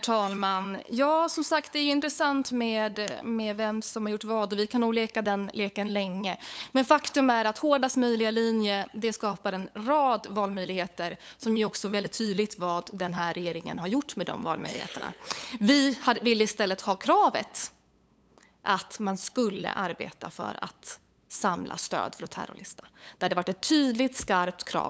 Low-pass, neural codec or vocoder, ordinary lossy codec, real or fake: none; codec, 16 kHz, 8 kbps, FunCodec, trained on LibriTTS, 25 frames a second; none; fake